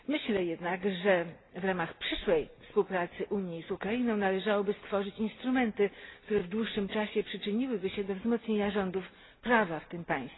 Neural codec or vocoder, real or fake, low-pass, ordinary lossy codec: none; real; 7.2 kHz; AAC, 16 kbps